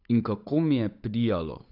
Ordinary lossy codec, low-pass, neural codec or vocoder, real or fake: none; 5.4 kHz; codec, 16 kHz, 8 kbps, FunCodec, trained on Chinese and English, 25 frames a second; fake